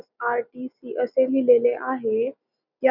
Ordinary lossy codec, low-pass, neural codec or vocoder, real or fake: none; 5.4 kHz; none; real